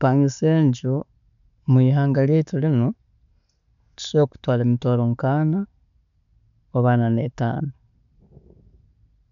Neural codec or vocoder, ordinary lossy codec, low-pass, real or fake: none; none; 7.2 kHz; real